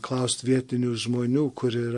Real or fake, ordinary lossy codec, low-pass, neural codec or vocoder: real; MP3, 48 kbps; 10.8 kHz; none